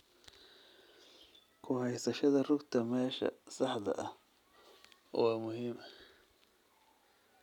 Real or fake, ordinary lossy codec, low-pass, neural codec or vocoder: real; none; 19.8 kHz; none